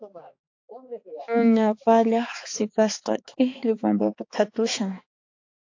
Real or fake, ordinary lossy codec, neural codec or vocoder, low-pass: fake; AAC, 48 kbps; codec, 16 kHz, 4 kbps, X-Codec, HuBERT features, trained on balanced general audio; 7.2 kHz